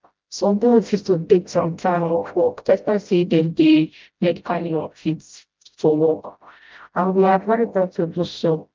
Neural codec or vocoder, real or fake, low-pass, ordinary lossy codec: codec, 16 kHz, 0.5 kbps, FreqCodec, smaller model; fake; 7.2 kHz; Opus, 24 kbps